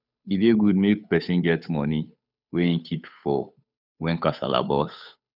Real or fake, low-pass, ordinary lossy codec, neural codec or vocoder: fake; 5.4 kHz; none; codec, 16 kHz, 8 kbps, FunCodec, trained on Chinese and English, 25 frames a second